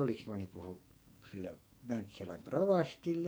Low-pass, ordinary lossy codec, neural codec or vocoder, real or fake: none; none; codec, 44.1 kHz, 2.6 kbps, SNAC; fake